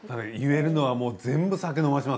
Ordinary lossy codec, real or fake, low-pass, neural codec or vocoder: none; real; none; none